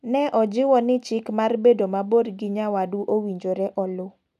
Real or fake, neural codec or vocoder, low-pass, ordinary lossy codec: real; none; 14.4 kHz; none